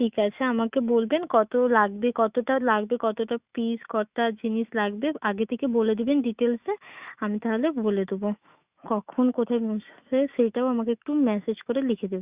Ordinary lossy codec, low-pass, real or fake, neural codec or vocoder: Opus, 64 kbps; 3.6 kHz; fake; autoencoder, 48 kHz, 128 numbers a frame, DAC-VAE, trained on Japanese speech